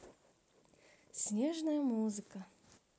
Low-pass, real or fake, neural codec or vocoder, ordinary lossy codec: none; real; none; none